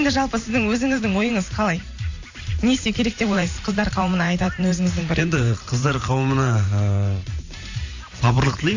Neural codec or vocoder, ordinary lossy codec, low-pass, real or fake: vocoder, 44.1 kHz, 128 mel bands every 512 samples, BigVGAN v2; AAC, 48 kbps; 7.2 kHz; fake